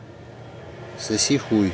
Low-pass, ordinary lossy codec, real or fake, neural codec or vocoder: none; none; real; none